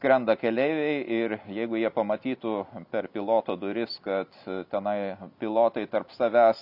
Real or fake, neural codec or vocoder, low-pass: real; none; 5.4 kHz